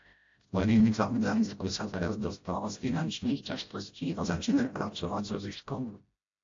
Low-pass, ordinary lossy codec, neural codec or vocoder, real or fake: 7.2 kHz; AAC, 48 kbps; codec, 16 kHz, 0.5 kbps, FreqCodec, smaller model; fake